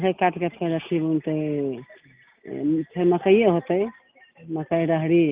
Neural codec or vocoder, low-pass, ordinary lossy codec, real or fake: none; 3.6 kHz; Opus, 32 kbps; real